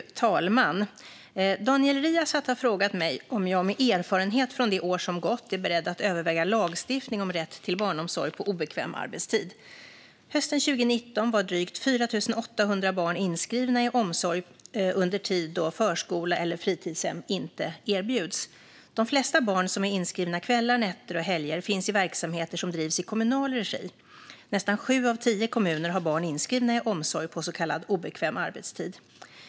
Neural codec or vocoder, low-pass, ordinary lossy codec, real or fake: none; none; none; real